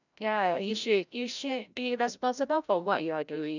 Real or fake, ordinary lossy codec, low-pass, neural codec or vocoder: fake; none; 7.2 kHz; codec, 16 kHz, 0.5 kbps, FreqCodec, larger model